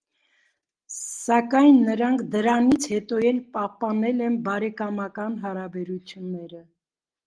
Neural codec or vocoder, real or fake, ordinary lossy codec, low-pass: none; real; Opus, 24 kbps; 9.9 kHz